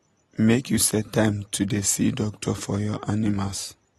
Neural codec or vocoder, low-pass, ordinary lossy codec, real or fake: none; 19.8 kHz; AAC, 32 kbps; real